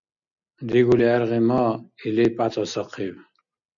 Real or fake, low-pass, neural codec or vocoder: real; 7.2 kHz; none